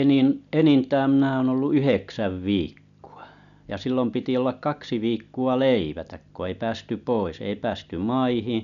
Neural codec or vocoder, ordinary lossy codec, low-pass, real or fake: none; none; 7.2 kHz; real